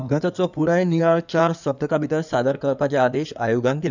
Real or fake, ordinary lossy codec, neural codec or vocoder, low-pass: fake; none; codec, 16 kHz in and 24 kHz out, 2.2 kbps, FireRedTTS-2 codec; 7.2 kHz